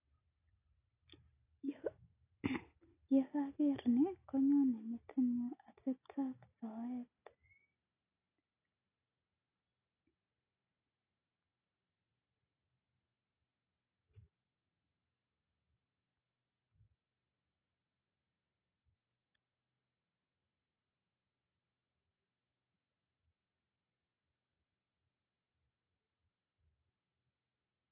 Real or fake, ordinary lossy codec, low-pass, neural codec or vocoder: real; AAC, 24 kbps; 3.6 kHz; none